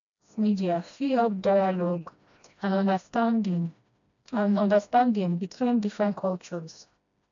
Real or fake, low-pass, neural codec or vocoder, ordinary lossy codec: fake; 7.2 kHz; codec, 16 kHz, 1 kbps, FreqCodec, smaller model; MP3, 64 kbps